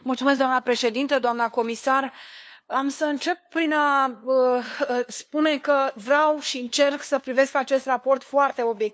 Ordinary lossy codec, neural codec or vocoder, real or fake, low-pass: none; codec, 16 kHz, 2 kbps, FunCodec, trained on LibriTTS, 25 frames a second; fake; none